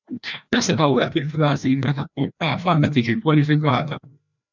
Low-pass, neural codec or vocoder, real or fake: 7.2 kHz; codec, 16 kHz, 1 kbps, FreqCodec, larger model; fake